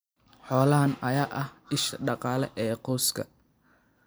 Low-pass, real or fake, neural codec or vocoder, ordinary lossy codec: none; fake; vocoder, 44.1 kHz, 128 mel bands every 256 samples, BigVGAN v2; none